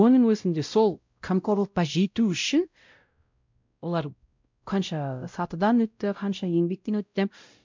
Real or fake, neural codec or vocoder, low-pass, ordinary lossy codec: fake; codec, 16 kHz, 0.5 kbps, X-Codec, WavLM features, trained on Multilingual LibriSpeech; 7.2 kHz; MP3, 64 kbps